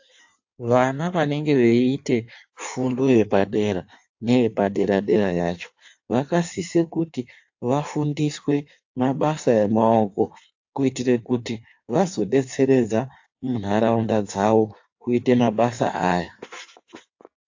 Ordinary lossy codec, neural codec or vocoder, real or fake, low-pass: AAC, 48 kbps; codec, 16 kHz in and 24 kHz out, 1.1 kbps, FireRedTTS-2 codec; fake; 7.2 kHz